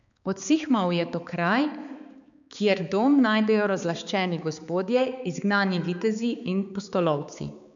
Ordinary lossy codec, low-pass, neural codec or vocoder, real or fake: none; 7.2 kHz; codec, 16 kHz, 4 kbps, X-Codec, HuBERT features, trained on balanced general audio; fake